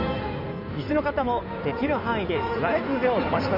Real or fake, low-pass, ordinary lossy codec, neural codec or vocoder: fake; 5.4 kHz; AAC, 48 kbps; codec, 16 kHz in and 24 kHz out, 2.2 kbps, FireRedTTS-2 codec